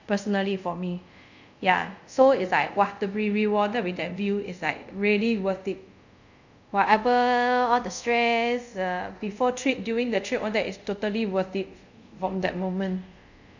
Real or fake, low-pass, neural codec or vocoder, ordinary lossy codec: fake; 7.2 kHz; codec, 24 kHz, 0.5 kbps, DualCodec; none